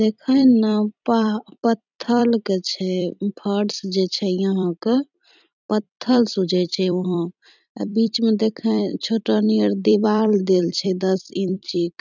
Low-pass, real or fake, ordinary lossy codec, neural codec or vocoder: 7.2 kHz; fake; none; vocoder, 44.1 kHz, 128 mel bands every 256 samples, BigVGAN v2